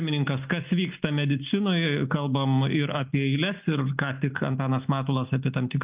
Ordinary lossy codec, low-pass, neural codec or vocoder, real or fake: Opus, 32 kbps; 3.6 kHz; none; real